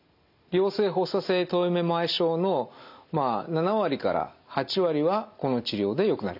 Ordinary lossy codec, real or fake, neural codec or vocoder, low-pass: none; real; none; 5.4 kHz